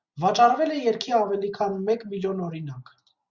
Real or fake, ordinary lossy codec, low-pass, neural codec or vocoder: real; Opus, 64 kbps; 7.2 kHz; none